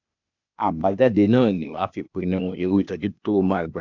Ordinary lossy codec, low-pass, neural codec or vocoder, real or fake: none; 7.2 kHz; codec, 16 kHz, 0.8 kbps, ZipCodec; fake